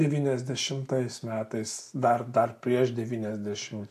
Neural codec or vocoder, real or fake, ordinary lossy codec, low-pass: none; real; AAC, 64 kbps; 14.4 kHz